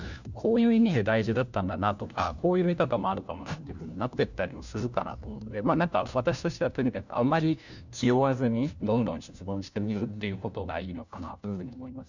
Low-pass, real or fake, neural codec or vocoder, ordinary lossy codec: 7.2 kHz; fake; codec, 16 kHz, 1 kbps, FunCodec, trained on LibriTTS, 50 frames a second; none